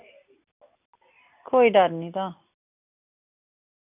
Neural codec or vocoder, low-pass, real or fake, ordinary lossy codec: none; 3.6 kHz; real; none